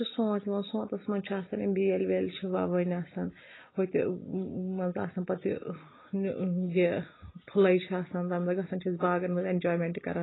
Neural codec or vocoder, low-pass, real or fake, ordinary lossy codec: none; 7.2 kHz; real; AAC, 16 kbps